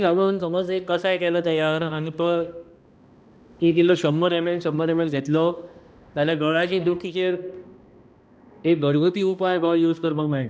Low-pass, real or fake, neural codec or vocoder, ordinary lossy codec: none; fake; codec, 16 kHz, 1 kbps, X-Codec, HuBERT features, trained on balanced general audio; none